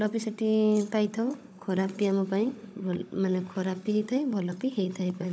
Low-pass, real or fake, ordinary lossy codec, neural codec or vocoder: none; fake; none; codec, 16 kHz, 16 kbps, FunCodec, trained on Chinese and English, 50 frames a second